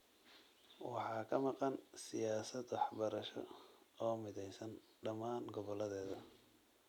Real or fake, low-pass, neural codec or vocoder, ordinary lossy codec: real; 19.8 kHz; none; none